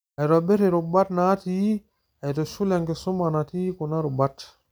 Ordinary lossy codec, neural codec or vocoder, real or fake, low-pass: none; none; real; none